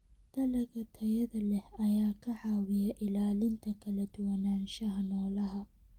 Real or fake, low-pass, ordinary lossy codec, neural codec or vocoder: real; 19.8 kHz; Opus, 24 kbps; none